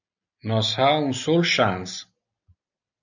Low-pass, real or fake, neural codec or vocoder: 7.2 kHz; real; none